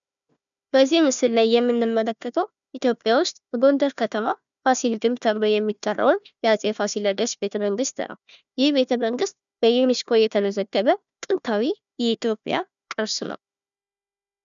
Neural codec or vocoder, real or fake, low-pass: codec, 16 kHz, 1 kbps, FunCodec, trained on Chinese and English, 50 frames a second; fake; 7.2 kHz